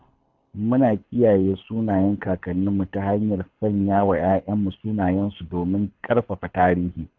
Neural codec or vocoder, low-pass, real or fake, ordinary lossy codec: codec, 24 kHz, 6 kbps, HILCodec; 7.2 kHz; fake; none